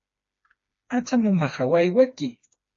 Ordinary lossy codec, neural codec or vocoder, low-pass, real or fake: MP3, 48 kbps; codec, 16 kHz, 2 kbps, FreqCodec, smaller model; 7.2 kHz; fake